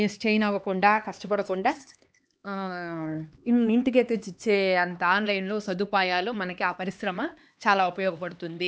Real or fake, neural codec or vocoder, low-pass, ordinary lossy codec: fake; codec, 16 kHz, 2 kbps, X-Codec, HuBERT features, trained on LibriSpeech; none; none